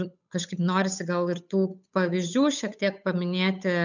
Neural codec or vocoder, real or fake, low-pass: codec, 16 kHz, 8 kbps, FunCodec, trained on Chinese and English, 25 frames a second; fake; 7.2 kHz